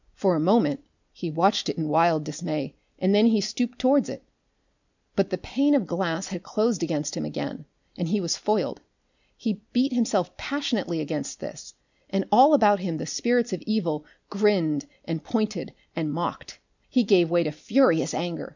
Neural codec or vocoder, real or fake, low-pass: none; real; 7.2 kHz